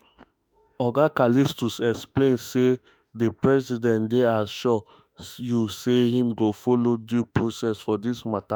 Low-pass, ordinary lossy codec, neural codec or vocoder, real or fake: none; none; autoencoder, 48 kHz, 32 numbers a frame, DAC-VAE, trained on Japanese speech; fake